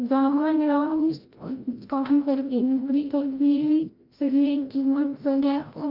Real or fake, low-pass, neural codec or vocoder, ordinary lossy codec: fake; 5.4 kHz; codec, 16 kHz, 0.5 kbps, FreqCodec, larger model; Opus, 32 kbps